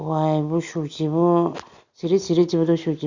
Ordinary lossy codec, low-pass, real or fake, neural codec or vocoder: Opus, 64 kbps; 7.2 kHz; real; none